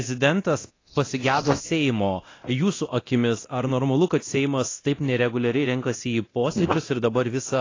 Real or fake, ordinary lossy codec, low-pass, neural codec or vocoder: fake; AAC, 32 kbps; 7.2 kHz; codec, 24 kHz, 0.9 kbps, DualCodec